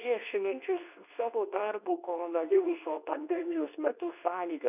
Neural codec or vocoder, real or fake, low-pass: codec, 24 kHz, 0.9 kbps, WavTokenizer, medium speech release version 2; fake; 3.6 kHz